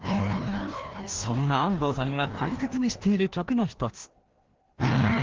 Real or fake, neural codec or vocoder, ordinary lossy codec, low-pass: fake; codec, 16 kHz, 1 kbps, FreqCodec, larger model; Opus, 16 kbps; 7.2 kHz